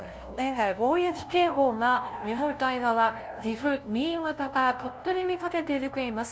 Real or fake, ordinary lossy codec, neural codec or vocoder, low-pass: fake; none; codec, 16 kHz, 0.5 kbps, FunCodec, trained on LibriTTS, 25 frames a second; none